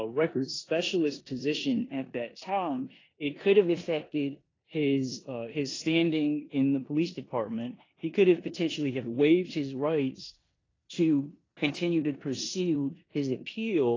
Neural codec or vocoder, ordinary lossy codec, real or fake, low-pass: codec, 16 kHz in and 24 kHz out, 0.9 kbps, LongCat-Audio-Codec, four codebook decoder; AAC, 32 kbps; fake; 7.2 kHz